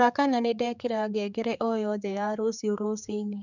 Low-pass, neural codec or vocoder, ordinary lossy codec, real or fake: 7.2 kHz; codec, 16 kHz, 4 kbps, X-Codec, HuBERT features, trained on general audio; none; fake